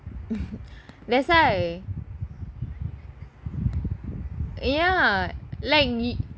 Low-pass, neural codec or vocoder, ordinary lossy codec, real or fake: none; none; none; real